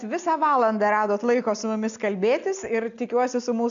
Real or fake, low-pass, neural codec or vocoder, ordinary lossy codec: real; 7.2 kHz; none; MP3, 64 kbps